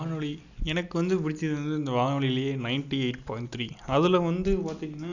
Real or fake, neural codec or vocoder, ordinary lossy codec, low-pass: real; none; none; 7.2 kHz